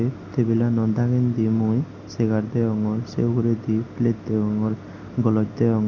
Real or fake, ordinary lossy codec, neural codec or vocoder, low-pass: real; none; none; 7.2 kHz